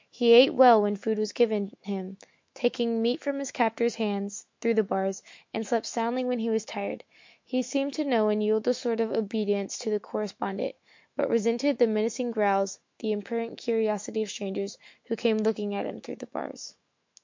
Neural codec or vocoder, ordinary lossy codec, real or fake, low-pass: autoencoder, 48 kHz, 128 numbers a frame, DAC-VAE, trained on Japanese speech; MP3, 48 kbps; fake; 7.2 kHz